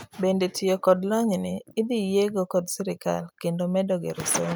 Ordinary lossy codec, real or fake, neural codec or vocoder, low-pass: none; real; none; none